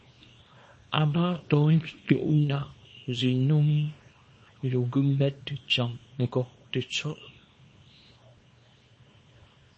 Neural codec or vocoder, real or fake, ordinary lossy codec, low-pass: codec, 24 kHz, 0.9 kbps, WavTokenizer, small release; fake; MP3, 32 kbps; 10.8 kHz